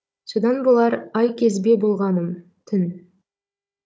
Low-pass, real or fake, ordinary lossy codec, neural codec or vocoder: none; fake; none; codec, 16 kHz, 16 kbps, FunCodec, trained on Chinese and English, 50 frames a second